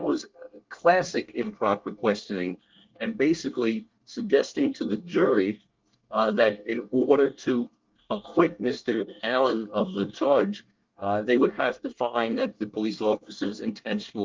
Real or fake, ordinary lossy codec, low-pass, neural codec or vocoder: fake; Opus, 24 kbps; 7.2 kHz; codec, 24 kHz, 1 kbps, SNAC